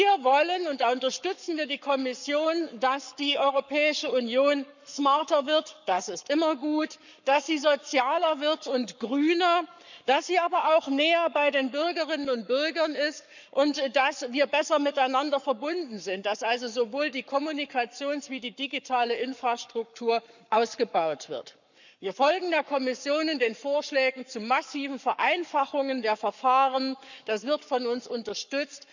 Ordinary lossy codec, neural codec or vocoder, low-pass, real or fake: none; codec, 44.1 kHz, 7.8 kbps, Pupu-Codec; 7.2 kHz; fake